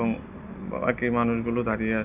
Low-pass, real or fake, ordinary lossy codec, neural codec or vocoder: 3.6 kHz; real; none; none